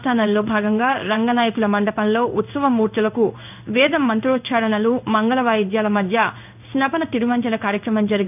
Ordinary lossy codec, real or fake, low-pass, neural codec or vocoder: none; fake; 3.6 kHz; codec, 16 kHz in and 24 kHz out, 1 kbps, XY-Tokenizer